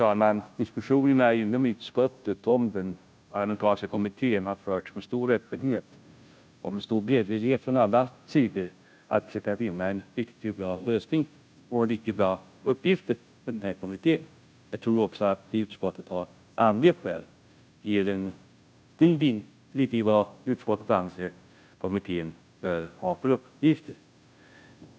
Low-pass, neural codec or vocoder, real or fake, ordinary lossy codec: none; codec, 16 kHz, 0.5 kbps, FunCodec, trained on Chinese and English, 25 frames a second; fake; none